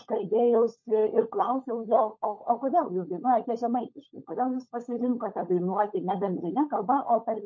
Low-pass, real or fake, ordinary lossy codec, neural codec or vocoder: 7.2 kHz; fake; MP3, 32 kbps; codec, 16 kHz, 16 kbps, FunCodec, trained on LibriTTS, 50 frames a second